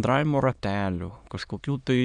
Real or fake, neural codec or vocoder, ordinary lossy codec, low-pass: fake; autoencoder, 22.05 kHz, a latent of 192 numbers a frame, VITS, trained on many speakers; MP3, 96 kbps; 9.9 kHz